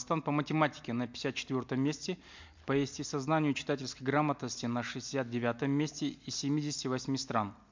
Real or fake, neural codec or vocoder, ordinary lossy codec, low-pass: real; none; MP3, 64 kbps; 7.2 kHz